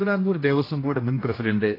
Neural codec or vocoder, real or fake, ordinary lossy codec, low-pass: codec, 16 kHz, 1 kbps, X-Codec, HuBERT features, trained on general audio; fake; AAC, 24 kbps; 5.4 kHz